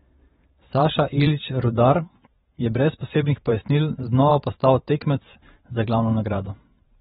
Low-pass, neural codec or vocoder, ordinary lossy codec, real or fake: 19.8 kHz; vocoder, 44.1 kHz, 128 mel bands every 512 samples, BigVGAN v2; AAC, 16 kbps; fake